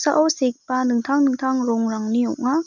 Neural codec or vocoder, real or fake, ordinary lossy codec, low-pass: none; real; none; 7.2 kHz